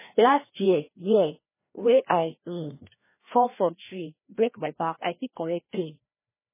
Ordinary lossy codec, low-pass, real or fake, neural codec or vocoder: MP3, 16 kbps; 3.6 kHz; fake; codec, 16 kHz, 1 kbps, FreqCodec, larger model